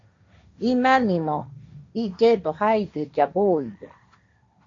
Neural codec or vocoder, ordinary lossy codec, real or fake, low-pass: codec, 16 kHz, 1.1 kbps, Voila-Tokenizer; MP3, 48 kbps; fake; 7.2 kHz